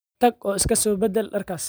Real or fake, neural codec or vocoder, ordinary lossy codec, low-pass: real; none; none; none